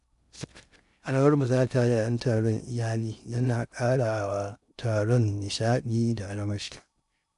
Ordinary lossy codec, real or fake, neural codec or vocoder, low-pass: none; fake; codec, 16 kHz in and 24 kHz out, 0.6 kbps, FocalCodec, streaming, 2048 codes; 10.8 kHz